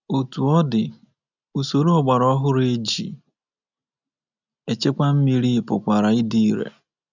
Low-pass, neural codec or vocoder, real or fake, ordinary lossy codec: 7.2 kHz; none; real; none